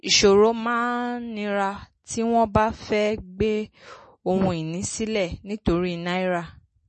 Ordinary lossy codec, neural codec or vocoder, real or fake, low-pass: MP3, 32 kbps; none; real; 10.8 kHz